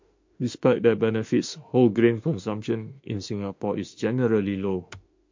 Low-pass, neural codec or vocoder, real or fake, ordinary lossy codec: 7.2 kHz; autoencoder, 48 kHz, 32 numbers a frame, DAC-VAE, trained on Japanese speech; fake; MP3, 48 kbps